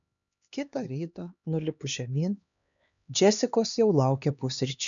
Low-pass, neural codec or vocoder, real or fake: 7.2 kHz; codec, 16 kHz, 2 kbps, X-Codec, HuBERT features, trained on LibriSpeech; fake